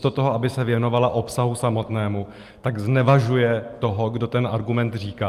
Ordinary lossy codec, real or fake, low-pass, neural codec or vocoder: Opus, 32 kbps; real; 14.4 kHz; none